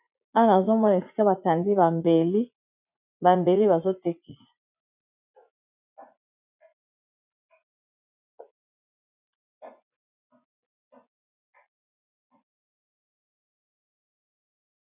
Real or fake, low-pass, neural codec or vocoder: fake; 3.6 kHz; vocoder, 44.1 kHz, 80 mel bands, Vocos